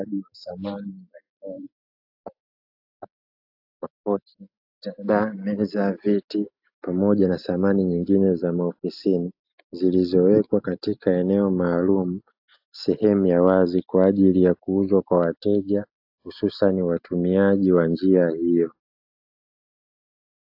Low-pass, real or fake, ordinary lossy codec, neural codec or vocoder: 5.4 kHz; real; AAC, 48 kbps; none